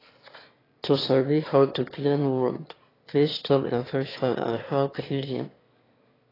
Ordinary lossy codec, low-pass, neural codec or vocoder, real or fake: AAC, 24 kbps; 5.4 kHz; autoencoder, 22.05 kHz, a latent of 192 numbers a frame, VITS, trained on one speaker; fake